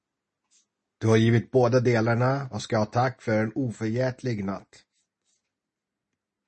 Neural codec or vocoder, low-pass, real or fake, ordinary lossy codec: none; 10.8 kHz; real; MP3, 32 kbps